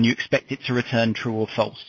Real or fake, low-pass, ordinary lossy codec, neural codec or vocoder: real; 7.2 kHz; MP3, 32 kbps; none